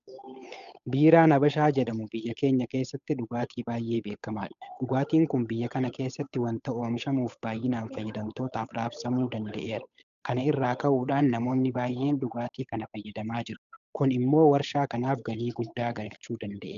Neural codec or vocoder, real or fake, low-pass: codec, 16 kHz, 8 kbps, FunCodec, trained on Chinese and English, 25 frames a second; fake; 7.2 kHz